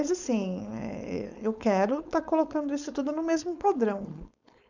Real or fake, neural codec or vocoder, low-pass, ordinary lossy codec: fake; codec, 16 kHz, 4.8 kbps, FACodec; 7.2 kHz; none